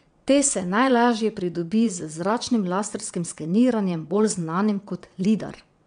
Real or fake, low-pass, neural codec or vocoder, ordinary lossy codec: fake; 9.9 kHz; vocoder, 22.05 kHz, 80 mel bands, WaveNeXt; none